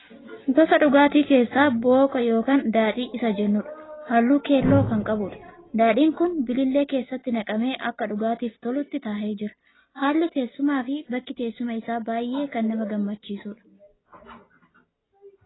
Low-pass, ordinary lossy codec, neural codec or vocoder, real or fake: 7.2 kHz; AAC, 16 kbps; none; real